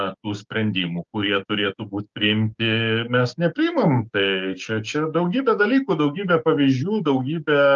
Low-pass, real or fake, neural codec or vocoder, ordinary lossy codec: 7.2 kHz; fake; codec, 16 kHz, 6 kbps, DAC; Opus, 32 kbps